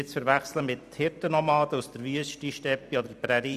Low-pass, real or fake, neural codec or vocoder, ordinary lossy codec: 14.4 kHz; fake; vocoder, 44.1 kHz, 128 mel bands every 256 samples, BigVGAN v2; none